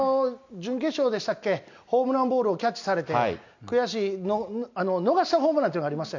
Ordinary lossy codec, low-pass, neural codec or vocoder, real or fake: none; 7.2 kHz; none; real